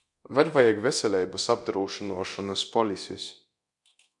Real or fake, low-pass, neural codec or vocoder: fake; 10.8 kHz; codec, 24 kHz, 0.9 kbps, DualCodec